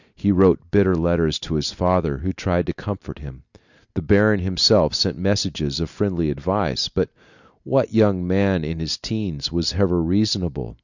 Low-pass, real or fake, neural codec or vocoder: 7.2 kHz; real; none